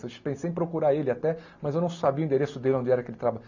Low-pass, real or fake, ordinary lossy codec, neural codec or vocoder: 7.2 kHz; real; none; none